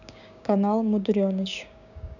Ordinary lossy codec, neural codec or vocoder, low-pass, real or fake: none; codec, 16 kHz, 6 kbps, DAC; 7.2 kHz; fake